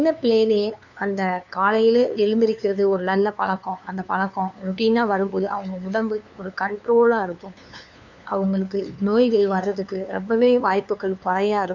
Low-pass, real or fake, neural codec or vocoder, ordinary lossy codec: 7.2 kHz; fake; codec, 16 kHz, 2 kbps, FunCodec, trained on LibriTTS, 25 frames a second; none